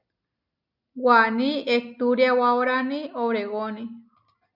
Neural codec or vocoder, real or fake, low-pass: none; real; 5.4 kHz